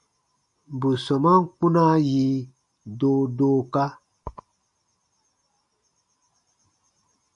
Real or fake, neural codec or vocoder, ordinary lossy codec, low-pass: real; none; MP3, 96 kbps; 10.8 kHz